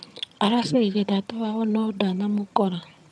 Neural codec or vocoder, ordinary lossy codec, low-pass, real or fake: vocoder, 22.05 kHz, 80 mel bands, HiFi-GAN; none; none; fake